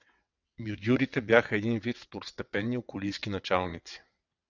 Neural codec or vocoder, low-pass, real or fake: vocoder, 22.05 kHz, 80 mel bands, WaveNeXt; 7.2 kHz; fake